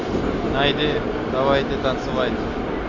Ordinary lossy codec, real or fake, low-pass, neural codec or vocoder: AAC, 48 kbps; real; 7.2 kHz; none